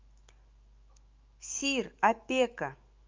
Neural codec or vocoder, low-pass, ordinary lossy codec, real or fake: autoencoder, 48 kHz, 128 numbers a frame, DAC-VAE, trained on Japanese speech; 7.2 kHz; Opus, 32 kbps; fake